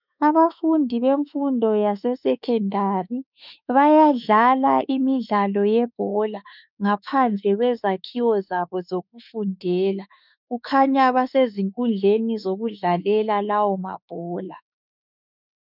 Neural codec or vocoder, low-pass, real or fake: autoencoder, 48 kHz, 32 numbers a frame, DAC-VAE, trained on Japanese speech; 5.4 kHz; fake